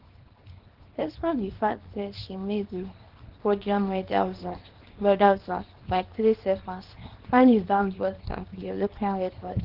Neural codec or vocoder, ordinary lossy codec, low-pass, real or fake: codec, 24 kHz, 0.9 kbps, WavTokenizer, small release; Opus, 16 kbps; 5.4 kHz; fake